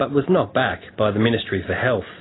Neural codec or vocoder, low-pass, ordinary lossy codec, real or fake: none; 7.2 kHz; AAC, 16 kbps; real